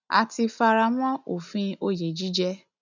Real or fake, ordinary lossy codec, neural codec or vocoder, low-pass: real; none; none; 7.2 kHz